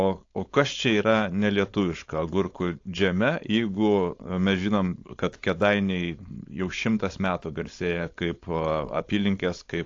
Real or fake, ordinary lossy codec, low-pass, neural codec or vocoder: fake; AAC, 48 kbps; 7.2 kHz; codec, 16 kHz, 4.8 kbps, FACodec